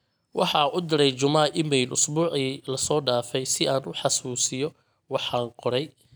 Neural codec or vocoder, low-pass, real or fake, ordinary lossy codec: none; none; real; none